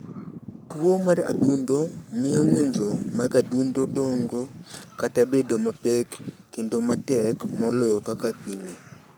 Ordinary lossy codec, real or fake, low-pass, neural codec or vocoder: none; fake; none; codec, 44.1 kHz, 3.4 kbps, Pupu-Codec